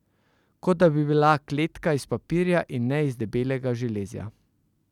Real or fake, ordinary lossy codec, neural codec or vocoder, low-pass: real; none; none; 19.8 kHz